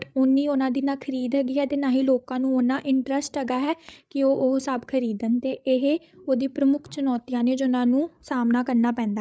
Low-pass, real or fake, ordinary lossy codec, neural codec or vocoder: none; fake; none; codec, 16 kHz, 8 kbps, FreqCodec, larger model